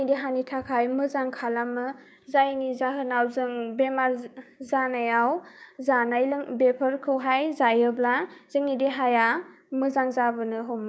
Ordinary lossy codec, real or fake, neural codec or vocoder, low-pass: none; fake; codec, 16 kHz, 6 kbps, DAC; none